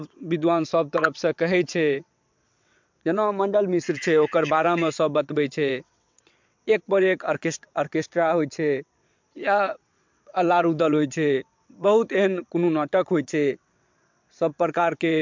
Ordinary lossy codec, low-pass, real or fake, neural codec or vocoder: MP3, 64 kbps; 7.2 kHz; fake; vocoder, 22.05 kHz, 80 mel bands, WaveNeXt